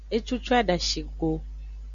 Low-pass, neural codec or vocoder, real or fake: 7.2 kHz; none; real